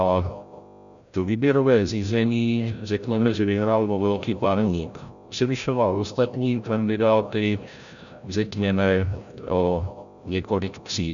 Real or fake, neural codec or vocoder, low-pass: fake; codec, 16 kHz, 0.5 kbps, FreqCodec, larger model; 7.2 kHz